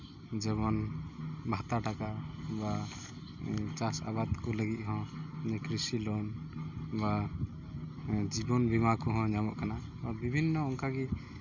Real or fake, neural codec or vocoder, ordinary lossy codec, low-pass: real; none; Opus, 64 kbps; 7.2 kHz